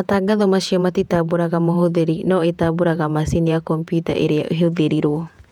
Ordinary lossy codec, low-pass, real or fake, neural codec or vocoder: none; 19.8 kHz; fake; vocoder, 48 kHz, 128 mel bands, Vocos